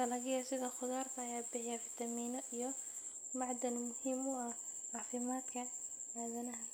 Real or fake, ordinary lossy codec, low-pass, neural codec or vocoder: real; none; none; none